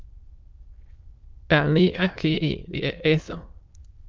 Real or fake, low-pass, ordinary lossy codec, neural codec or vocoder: fake; 7.2 kHz; Opus, 24 kbps; autoencoder, 22.05 kHz, a latent of 192 numbers a frame, VITS, trained on many speakers